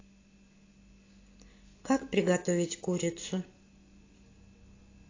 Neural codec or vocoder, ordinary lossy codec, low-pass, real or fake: none; AAC, 32 kbps; 7.2 kHz; real